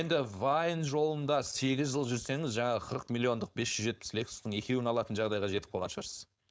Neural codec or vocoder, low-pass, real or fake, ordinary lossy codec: codec, 16 kHz, 4.8 kbps, FACodec; none; fake; none